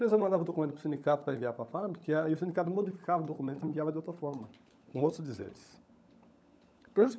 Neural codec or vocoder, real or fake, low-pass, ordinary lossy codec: codec, 16 kHz, 16 kbps, FunCodec, trained on LibriTTS, 50 frames a second; fake; none; none